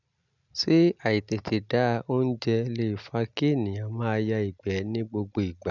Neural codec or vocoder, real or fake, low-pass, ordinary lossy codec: none; real; 7.2 kHz; none